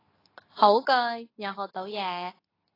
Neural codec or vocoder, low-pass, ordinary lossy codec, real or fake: codec, 24 kHz, 0.9 kbps, WavTokenizer, medium speech release version 2; 5.4 kHz; AAC, 24 kbps; fake